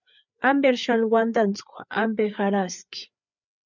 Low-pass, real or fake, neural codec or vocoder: 7.2 kHz; fake; codec, 16 kHz, 4 kbps, FreqCodec, larger model